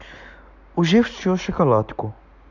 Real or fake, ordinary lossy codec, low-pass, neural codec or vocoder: real; none; 7.2 kHz; none